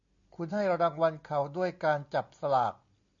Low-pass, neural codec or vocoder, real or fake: 7.2 kHz; none; real